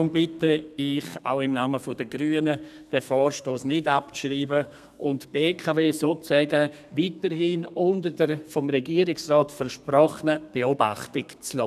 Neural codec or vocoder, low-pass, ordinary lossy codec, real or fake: codec, 32 kHz, 1.9 kbps, SNAC; 14.4 kHz; none; fake